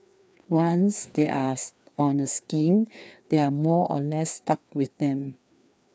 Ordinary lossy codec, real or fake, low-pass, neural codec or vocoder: none; fake; none; codec, 16 kHz, 2 kbps, FreqCodec, larger model